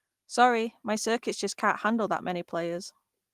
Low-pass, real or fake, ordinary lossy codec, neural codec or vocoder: 14.4 kHz; real; Opus, 24 kbps; none